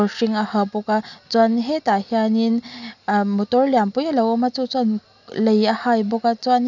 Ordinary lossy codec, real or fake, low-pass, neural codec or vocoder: none; real; 7.2 kHz; none